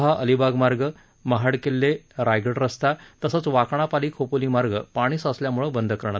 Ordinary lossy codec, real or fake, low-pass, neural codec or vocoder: none; real; none; none